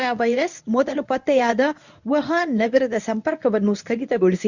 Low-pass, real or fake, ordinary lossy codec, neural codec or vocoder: 7.2 kHz; fake; none; codec, 24 kHz, 0.9 kbps, WavTokenizer, medium speech release version 1